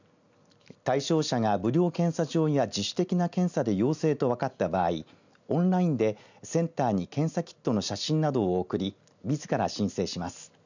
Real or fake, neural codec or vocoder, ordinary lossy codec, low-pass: real; none; none; 7.2 kHz